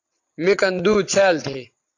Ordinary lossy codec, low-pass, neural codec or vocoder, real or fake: AAC, 48 kbps; 7.2 kHz; vocoder, 22.05 kHz, 80 mel bands, WaveNeXt; fake